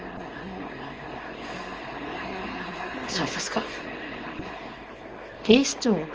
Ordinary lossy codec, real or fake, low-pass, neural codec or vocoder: Opus, 24 kbps; fake; 7.2 kHz; codec, 24 kHz, 0.9 kbps, WavTokenizer, small release